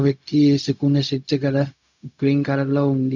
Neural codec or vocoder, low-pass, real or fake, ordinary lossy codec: codec, 16 kHz, 0.4 kbps, LongCat-Audio-Codec; 7.2 kHz; fake; none